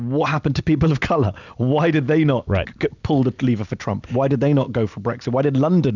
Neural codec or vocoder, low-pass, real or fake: none; 7.2 kHz; real